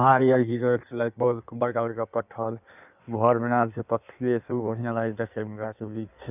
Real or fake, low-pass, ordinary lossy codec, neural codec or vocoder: fake; 3.6 kHz; none; codec, 16 kHz in and 24 kHz out, 1.1 kbps, FireRedTTS-2 codec